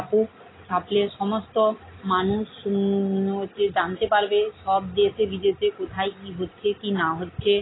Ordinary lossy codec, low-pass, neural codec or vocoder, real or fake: AAC, 16 kbps; 7.2 kHz; none; real